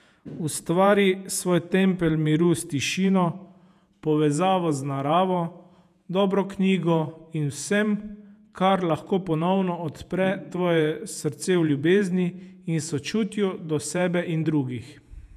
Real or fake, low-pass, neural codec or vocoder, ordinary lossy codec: fake; 14.4 kHz; vocoder, 48 kHz, 128 mel bands, Vocos; none